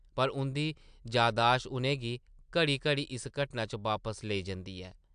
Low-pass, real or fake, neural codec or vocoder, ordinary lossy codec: 9.9 kHz; real; none; AAC, 96 kbps